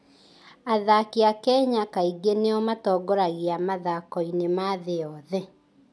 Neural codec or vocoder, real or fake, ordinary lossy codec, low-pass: none; real; none; none